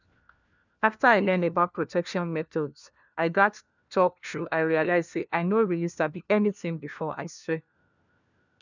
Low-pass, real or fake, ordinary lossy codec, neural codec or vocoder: 7.2 kHz; fake; none; codec, 16 kHz, 1 kbps, FunCodec, trained on LibriTTS, 50 frames a second